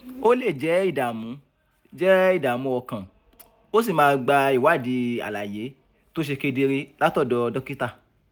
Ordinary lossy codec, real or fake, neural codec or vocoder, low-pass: none; real; none; none